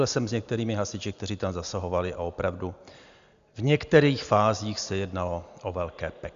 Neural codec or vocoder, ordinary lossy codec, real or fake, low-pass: none; Opus, 64 kbps; real; 7.2 kHz